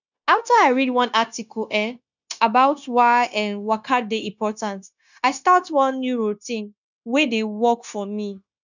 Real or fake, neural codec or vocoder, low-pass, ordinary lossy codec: fake; codec, 16 kHz, 0.9 kbps, LongCat-Audio-Codec; 7.2 kHz; none